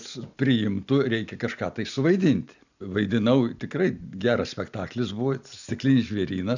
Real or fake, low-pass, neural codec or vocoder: real; 7.2 kHz; none